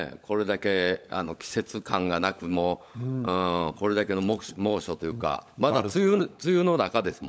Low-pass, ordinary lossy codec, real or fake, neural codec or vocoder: none; none; fake; codec, 16 kHz, 16 kbps, FunCodec, trained on LibriTTS, 50 frames a second